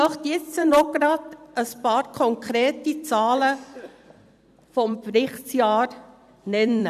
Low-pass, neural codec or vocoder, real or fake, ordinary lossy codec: 14.4 kHz; vocoder, 44.1 kHz, 128 mel bands every 256 samples, BigVGAN v2; fake; none